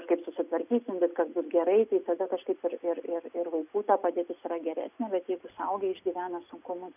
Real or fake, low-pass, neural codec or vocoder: real; 3.6 kHz; none